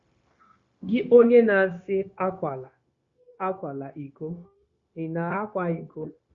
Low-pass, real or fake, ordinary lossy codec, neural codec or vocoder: 7.2 kHz; fake; Opus, 64 kbps; codec, 16 kHz, 0.9 kbps, LongCat-Audio-Codec